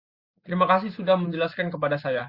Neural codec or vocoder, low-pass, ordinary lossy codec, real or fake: none; 5.4 kHz; Opus, 64 kbps; real